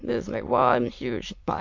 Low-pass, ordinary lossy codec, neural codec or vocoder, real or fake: 7.2 kHz; MP3, 48 kbps; autoencoder, 22.05 kHz, a latent of 192 numbers a frame, VITS, trained on many speakers; fake